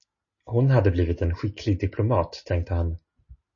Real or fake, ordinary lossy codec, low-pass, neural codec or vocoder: real; MP3, 32 kbps; 7.2 kHz; none